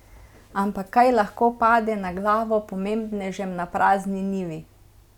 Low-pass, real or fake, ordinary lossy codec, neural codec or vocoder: 19.8 kHz; real; none; none